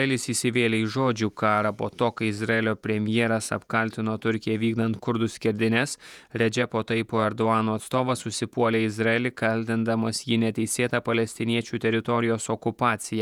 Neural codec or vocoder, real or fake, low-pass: vocoder, 48 kHz, 128 mel bands, Vocos; fake; 19.8 kHz